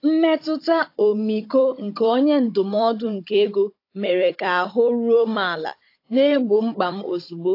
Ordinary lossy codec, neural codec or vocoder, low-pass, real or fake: AAC, 32 kbps; codec, 16 kHz, 16 kbps, FunCodec, trained on Chinese and English, 50 frames a second; 5.4 kHz; fake